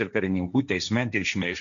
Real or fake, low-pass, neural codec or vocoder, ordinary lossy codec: fake; 7.2 kHz; codec, 16 kHz, 1.1 kbps, Voila-Tokenizer; MP3, 64 kbps